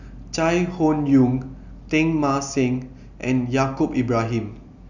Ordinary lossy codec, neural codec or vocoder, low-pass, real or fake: none; none; 7.2 kHz; real